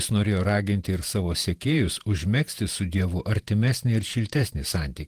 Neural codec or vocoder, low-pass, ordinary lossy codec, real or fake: vocoder, 48 kHz, 128 mel bands, Vocos; 14.4 kHz; Opus, 24 kbps; fake